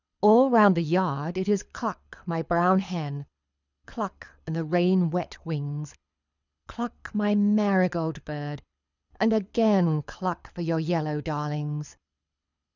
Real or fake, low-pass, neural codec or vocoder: fake; 7.2 kHz; codec, 24 kHz, 6 kbps, HILCodec